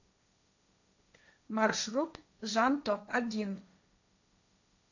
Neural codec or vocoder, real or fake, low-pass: codec, 16 kHz, 1.1 kbps, Voila-Tokenizer; fake; 7.2 kHz